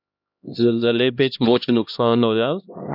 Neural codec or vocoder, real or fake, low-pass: codec, 16 kHz, 1 kbps, X-Codec, HuBERT features, trained on LibriSpeech; fake; 5.4 kHz